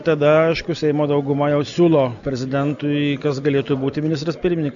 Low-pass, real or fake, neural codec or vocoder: 7.2 kHz; real; none